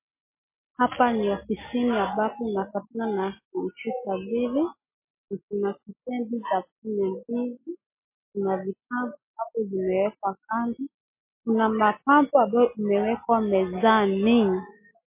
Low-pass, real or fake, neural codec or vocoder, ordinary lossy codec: 3.6 kHz; real; none; MP3, 24 kbps